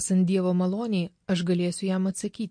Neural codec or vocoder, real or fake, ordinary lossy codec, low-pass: none; real; MP3, 48 kbps; 9.9 kHz